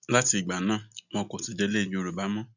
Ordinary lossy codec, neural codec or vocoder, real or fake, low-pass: none; none; real; 7.2 kHz